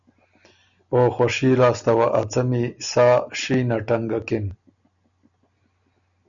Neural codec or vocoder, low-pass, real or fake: none; 7.2 kHz; real